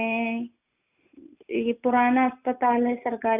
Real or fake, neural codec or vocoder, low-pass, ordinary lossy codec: real; none; 3.6 kHz; none